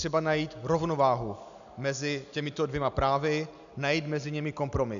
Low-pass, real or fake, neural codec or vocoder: 7.2 kHz; real; none